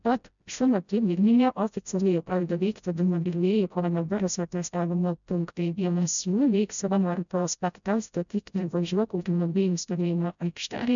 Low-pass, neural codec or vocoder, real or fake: 7.2 kHz; codec, 16 kHz, 0.5 kbps, FreqCodec, smaller model; fake